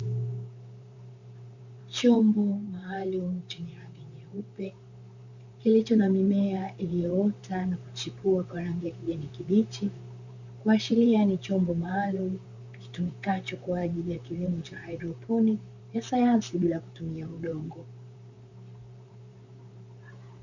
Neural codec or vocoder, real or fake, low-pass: vocoder, 44.1 kHz, 128 mel bands, Pupu-Vocoder; fake; 7.2 kHz